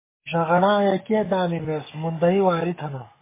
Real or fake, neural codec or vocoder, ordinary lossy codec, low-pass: fake; codec, 44.1 kHz, 7.8 kbps, DAC; MP3, 16 kbps; 3.6 kHz